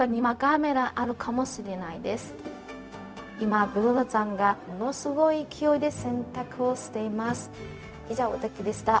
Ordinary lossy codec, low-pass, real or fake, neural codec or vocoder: none; none; fake; codec, 16 kHz, 0.4 kbps, LongCat-Audio-Codec